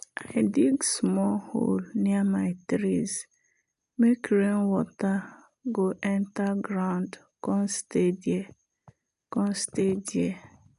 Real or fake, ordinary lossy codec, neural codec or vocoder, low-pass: real; none; none; 10.8 kHz